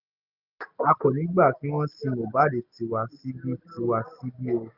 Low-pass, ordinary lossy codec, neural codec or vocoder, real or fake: 5.4 kHz; none; none; real